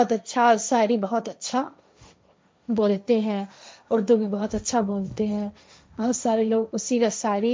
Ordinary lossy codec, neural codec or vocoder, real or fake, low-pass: none; codec, 16 kHz, 1.1 kbps, Voila-Tokenizer; fake; none